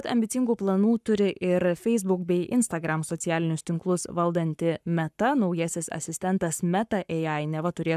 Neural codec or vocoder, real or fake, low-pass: codec, 44.1 kHz, 7.8 kbps, Pupu-Codec; fake; 14.4 kHz